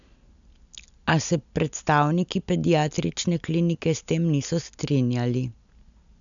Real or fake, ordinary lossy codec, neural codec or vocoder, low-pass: real; none; none; 7.2 kHz